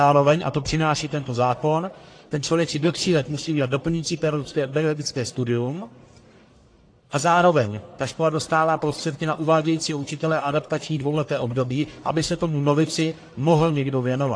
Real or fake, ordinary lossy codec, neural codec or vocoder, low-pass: fake; AAC, 48 kbps; codec, 44.1 kHz, 1.7 kbps, Pupu-Codec; 9.9 kHz